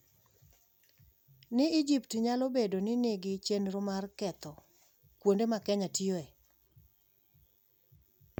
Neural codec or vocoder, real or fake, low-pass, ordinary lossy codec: none; real; 19.8 kHz; none